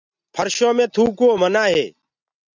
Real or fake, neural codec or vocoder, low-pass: real; none; 7.2 kHz